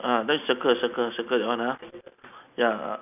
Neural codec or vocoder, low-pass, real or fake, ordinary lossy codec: none; 3.6 kHz; real; none